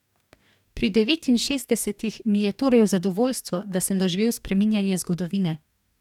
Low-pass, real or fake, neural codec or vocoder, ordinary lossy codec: 19.8 kHz; fake; codec, 44.1 kHz, 2.6 kbps, DAC; none